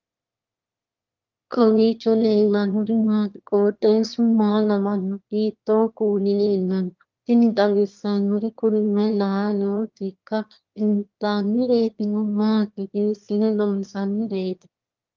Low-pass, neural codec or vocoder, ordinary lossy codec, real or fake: 7.2 kHz; autoencoder, 22.05 kHz, a latent of 192 numbers a frame, VITS, trained on one speaker; Opus, 32 kbps; fake